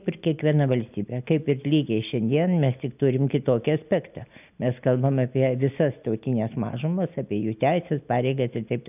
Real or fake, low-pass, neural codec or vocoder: real; 3.6 kHz; none